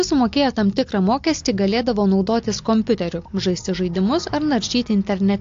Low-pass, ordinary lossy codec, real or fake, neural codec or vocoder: 7.2 kHz; AAC, 48 kbps; real; none